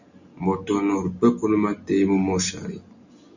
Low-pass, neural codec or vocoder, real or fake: 7.2 kHz; none; real